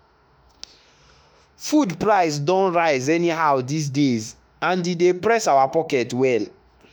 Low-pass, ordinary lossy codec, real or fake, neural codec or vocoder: none; none; fake; autoencoder, 48 kHz, 32 numbers a frame, DAC-VAE, trained on Japanese speech